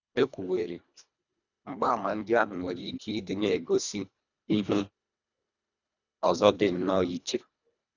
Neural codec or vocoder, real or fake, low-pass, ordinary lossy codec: codec, 24 kHz, 1.5 kbps, HILCodec; fake; 7.2 kHz; none